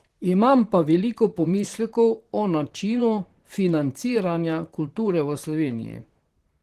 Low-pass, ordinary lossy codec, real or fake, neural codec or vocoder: 14.4 kHz; Opus, 16 kbps; fake; vocoder, 44.1 kHz, 128 mel bands, Pupu-Vocoder